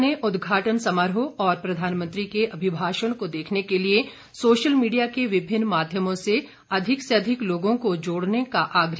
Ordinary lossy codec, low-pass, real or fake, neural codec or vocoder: none; none; real; none